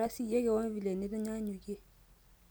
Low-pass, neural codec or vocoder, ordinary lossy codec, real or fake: none; none; none; real